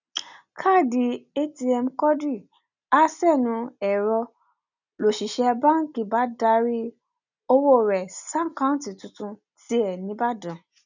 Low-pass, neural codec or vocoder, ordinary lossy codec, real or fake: 7.2 kHz; none; none; real